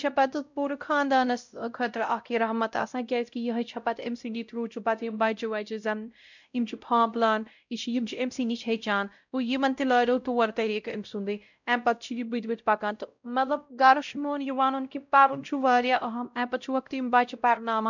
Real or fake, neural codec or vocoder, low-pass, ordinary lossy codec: fake; codec, 16 kHz, 0.5 kbps, X-Codec, WavLM features, trained on Multilingual LibriSpeech; 7.2 kHz; none